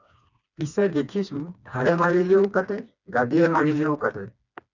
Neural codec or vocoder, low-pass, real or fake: codec, 16 kHz, 2 kbps, FreqCodec, smaller model; 7.2 kHz; fake